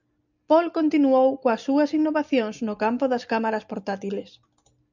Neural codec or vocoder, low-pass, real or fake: none; 7.2 kHz; real